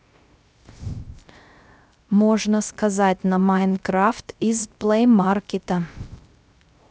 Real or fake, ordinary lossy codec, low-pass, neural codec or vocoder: fake; none; none; codec, 16 kHz, 0.3 kbps, FocalCodec